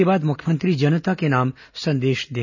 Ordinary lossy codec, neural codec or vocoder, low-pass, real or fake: none; none; 7.2 kHz; real